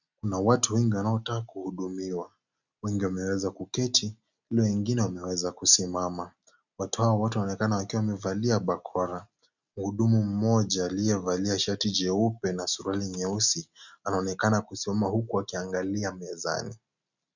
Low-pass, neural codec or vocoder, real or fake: 7.2 kHz; none; real